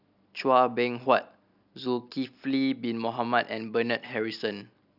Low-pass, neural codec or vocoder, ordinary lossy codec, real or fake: 5.4 kHz; none; none; real